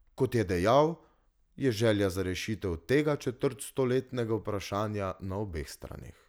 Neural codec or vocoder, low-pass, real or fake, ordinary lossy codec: none; none; real; none